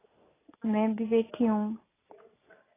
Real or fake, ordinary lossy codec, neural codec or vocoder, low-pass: real; AAC, 16 kbps; none; 3.6 kHz